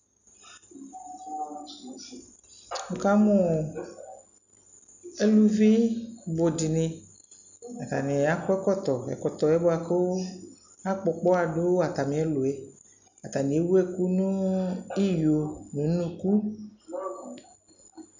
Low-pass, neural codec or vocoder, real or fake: 7.2 kHz; none; real